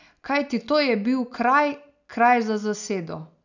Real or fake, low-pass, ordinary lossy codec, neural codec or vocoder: real; 7.2 kHz; none; none